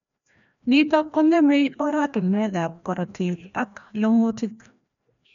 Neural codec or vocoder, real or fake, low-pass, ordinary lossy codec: codec, 16 kHz, 1 kbps, FreqCodec, larger model; fake; 7.2 kHz; none